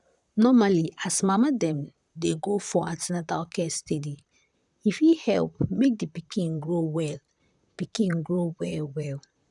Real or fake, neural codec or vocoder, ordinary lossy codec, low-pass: fake; vocoder, 44.1 kHz, 128 mel bands, Pupu-Vocoder; none; 10.8 kHz